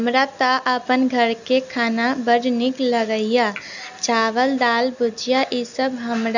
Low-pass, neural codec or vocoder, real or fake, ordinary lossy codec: 7.2 kHz; none; real; none